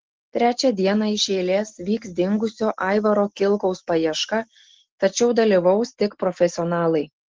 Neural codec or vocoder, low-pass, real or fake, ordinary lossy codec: none; 7.2 kHz; real; Opus, 16 kbps